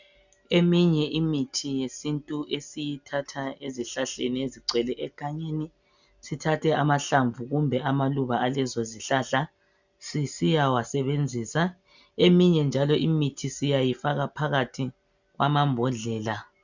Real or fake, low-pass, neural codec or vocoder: real; 7.2 kHz; none